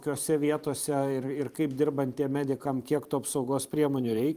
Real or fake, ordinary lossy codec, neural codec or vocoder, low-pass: fake; Opus, 32 kbps; vocoder, 44.1 kHz, 128 mel bands every 512 samples, BigVGAN v2; 14.4 kHz